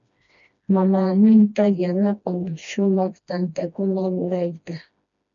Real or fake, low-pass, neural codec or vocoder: fake; 7.2 kHz; codec, 16 kHz, 1 kbps, FreqCodec, smaller model